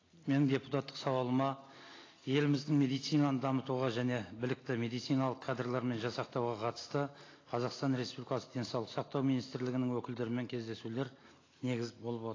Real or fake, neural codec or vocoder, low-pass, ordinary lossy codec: real; none; 7.2 kHz; AAC, 32 kbps